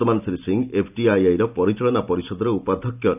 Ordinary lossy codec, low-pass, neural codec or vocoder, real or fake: none; 3.6 kHz; none; real